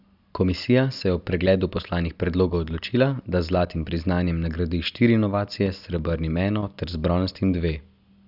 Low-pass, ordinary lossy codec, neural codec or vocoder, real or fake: 5.4 kHz; none; none; real